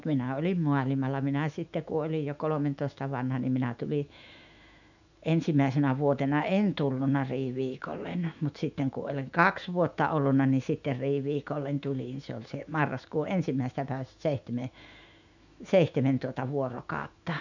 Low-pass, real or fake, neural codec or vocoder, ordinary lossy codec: 7.2 kHz; fake; autoencoder, 48 kHz, 128 numbers a frame, DAC-VAE, trained on Japanese speech; none